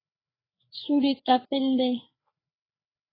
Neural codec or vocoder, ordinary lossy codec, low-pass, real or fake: codec, 16 kHz, 4 kbps, FreqCodec, larger model; AAC, 24 kbps; 5.4 kHz; fake